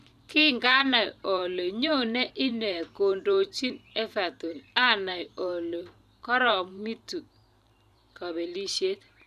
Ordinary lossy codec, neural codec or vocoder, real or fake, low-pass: none; vocoder, 44.1 kHz, 128 mel bands every 512 samples, BigVGAN v2; fake; 14.4 kHz